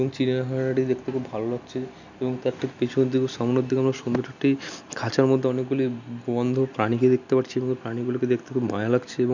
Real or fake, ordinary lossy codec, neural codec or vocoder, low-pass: real; none; none; 7.2 kHz